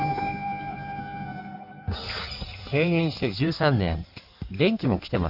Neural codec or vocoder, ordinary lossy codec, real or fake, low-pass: codec, 16 kHz in and 24 kHz out, 1.1 kbps, FireRedTTS-2 codec; none; fake; 5.4 kHz